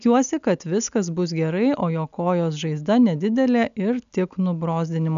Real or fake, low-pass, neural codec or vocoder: real; 7.2 kHz; none